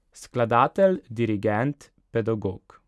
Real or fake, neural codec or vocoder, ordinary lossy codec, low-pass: real; none; none; none